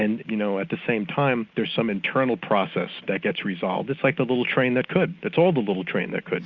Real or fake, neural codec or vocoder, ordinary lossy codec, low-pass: real; none; Opus, 64 kbps; 7.2 kHz